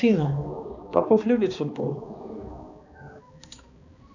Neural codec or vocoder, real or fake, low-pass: codec, 16 kHz, 2 kbps, X-Codec, HuBERT features, trained on balanced general audio; fake; 7.2 kHz